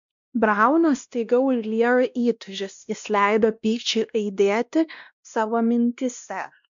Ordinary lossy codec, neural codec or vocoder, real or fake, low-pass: MP3, 64 kbps; codec, 16 kHz, 1 kbps, X-Codec, WavLM features, trained on Multilingual LibriSpeech; fake; 7.2 kHz